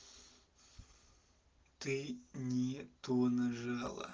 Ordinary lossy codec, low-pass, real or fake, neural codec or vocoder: Opus, 16 kbps; 7.2 kHz; real; none